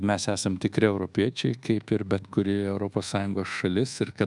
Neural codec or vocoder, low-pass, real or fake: codec, 24 kHz, 1.2 kbps, DualCodec; 10.8 kHz; fake